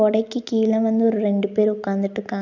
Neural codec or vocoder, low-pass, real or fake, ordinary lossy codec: none; 7.2 kHz; real; none